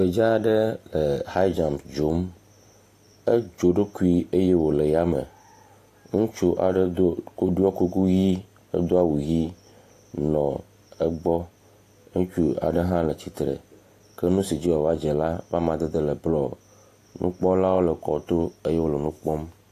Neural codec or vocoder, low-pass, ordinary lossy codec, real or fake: none; 14.4 kHz; AAC, 48 kbps; real